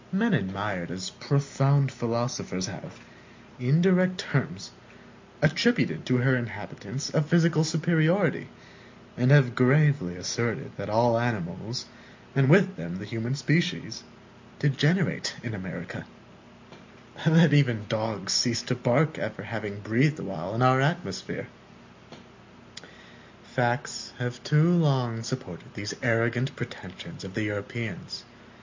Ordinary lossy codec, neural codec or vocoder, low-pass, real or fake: MP3, 64 kbps; none; 7.2 kHz; real